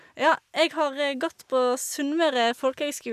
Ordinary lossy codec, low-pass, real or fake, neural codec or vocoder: none; 14.4 kHz; real; none